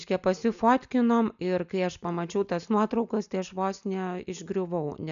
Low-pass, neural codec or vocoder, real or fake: 7.2 kHz; none; real